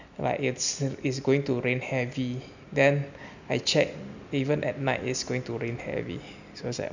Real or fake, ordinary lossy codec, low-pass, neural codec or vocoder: real; none; 7.2 kHz; none